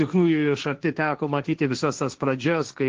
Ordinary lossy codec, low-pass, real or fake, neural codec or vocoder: Opus, 16 kbps; 7.2 kHz; fake; codec, 16 kHz, 1.1 kbps, Voila-Tokenizer